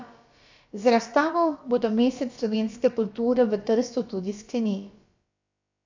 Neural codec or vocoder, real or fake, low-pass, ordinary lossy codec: codec, 16 kHz, about 1 kbps, DyCAST, with the encoder's durations; fake; 7.2 kHz; AAC, 48 kbps